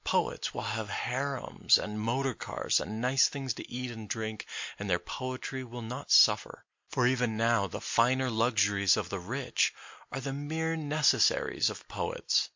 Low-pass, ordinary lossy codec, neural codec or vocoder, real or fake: 7.2 kHz; MP3, 48 kbps; none; real